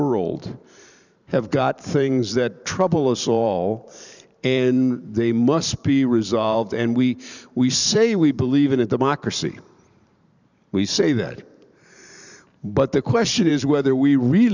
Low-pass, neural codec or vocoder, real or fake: 7.2 kHz; vocoder, 44.1 kHz, 128 mel bands every 512 samples, BigVGAN v2; fake